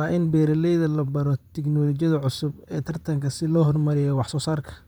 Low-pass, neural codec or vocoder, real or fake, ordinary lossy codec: none; vocoder, 44.1 kHz, 128 mel bands, Pupu-Vocoder; fake; none